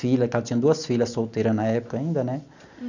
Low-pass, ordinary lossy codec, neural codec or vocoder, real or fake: 7.2 kHz; none; none; real